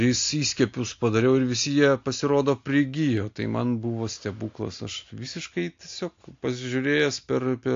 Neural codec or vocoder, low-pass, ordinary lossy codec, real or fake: none; 7.2 kHz; AAC, 48 kbps; real